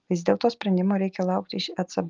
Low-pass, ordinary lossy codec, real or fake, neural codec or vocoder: 7.2 kHz; Opus, 32 kbps; real; none